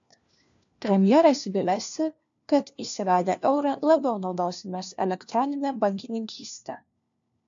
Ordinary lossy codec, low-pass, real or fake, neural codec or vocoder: MP3, 64 kbps; 7.2 kHz; fake; codec, 16 kHz, 1 kbps, FunCodec, trained on LibriTTS, 50 frames a second